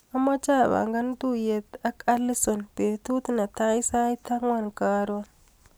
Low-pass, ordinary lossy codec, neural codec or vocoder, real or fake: none; none; none; real